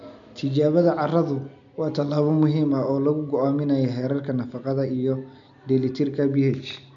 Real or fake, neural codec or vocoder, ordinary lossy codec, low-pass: real; none; MP3, 64 kbps; 7.2 kHz